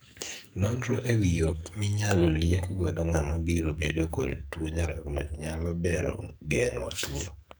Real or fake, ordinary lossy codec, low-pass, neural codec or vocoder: fake; none; none; codec, 44.1 kHz, 2.6 kbps, SNAC